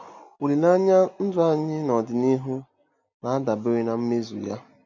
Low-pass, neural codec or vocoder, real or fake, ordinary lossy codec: 7.2 kHz; none; real; none